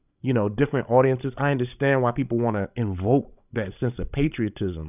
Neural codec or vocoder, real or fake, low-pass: codec, 16 kHz, 16 kbps, FreqCodec, larger model; fake; 3.6 kHz